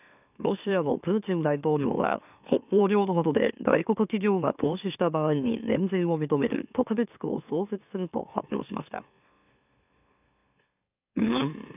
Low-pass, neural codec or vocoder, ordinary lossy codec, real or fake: 3.6 kHz; autoencoder, 44.1 kHz, a latent of 192 numbers a frame, MeloTTS; none; fake